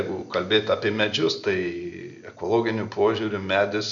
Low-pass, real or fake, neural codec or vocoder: 7.2 kHz; real; none